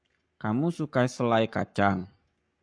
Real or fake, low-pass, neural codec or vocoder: fake; 9.9 kHz; codec, 44.1 kHz, 7.8 kbps, Pupu-Codec